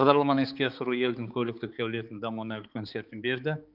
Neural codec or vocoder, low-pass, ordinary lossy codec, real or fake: codec, 16 kHz, 4 kbps, X-Codec, HuBERT features, trained on balanced general audio; 5.4 kHz; Opus, 24 kbps; fake